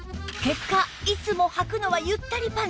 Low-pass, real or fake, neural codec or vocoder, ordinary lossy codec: none; real; none; none